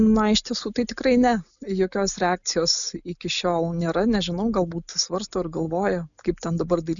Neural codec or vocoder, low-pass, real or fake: none; 7.2 kHz; real